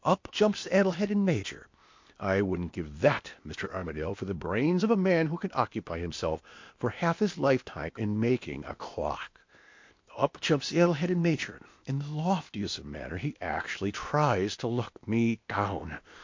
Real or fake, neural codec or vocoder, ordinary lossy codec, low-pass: fake; codec, 16 kHz, 0.8 kbps, ZipCodec; MP3, 48 kbps; 7.2 kHz